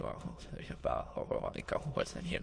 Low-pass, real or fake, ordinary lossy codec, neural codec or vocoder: 9.9 kHz; fake; MP3, 48 kbps; autoencoder, 22.05 kHz, a latent of 192 numbers a frame, VITS, trained on many speakers